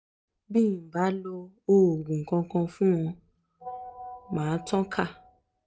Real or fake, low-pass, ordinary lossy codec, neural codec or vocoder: real; none; none; none